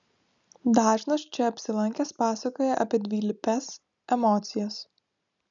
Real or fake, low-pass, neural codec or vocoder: real; 7.2 kHz; none